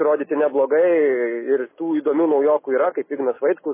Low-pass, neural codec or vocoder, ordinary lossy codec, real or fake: 3.6 kHz; none; MP3, 16 kbps; real